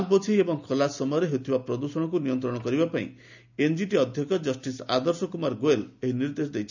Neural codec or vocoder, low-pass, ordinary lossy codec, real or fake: none; 7.2 kHz; none; real